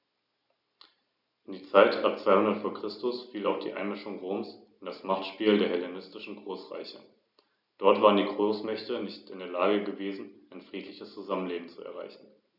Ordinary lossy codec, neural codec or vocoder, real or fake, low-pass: none; none; real; 5.4 kHz